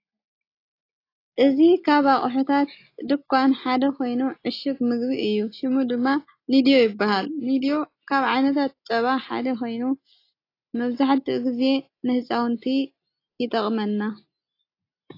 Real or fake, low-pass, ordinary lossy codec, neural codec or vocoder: real; 5.4 kHz; AAC, 32 kbps; none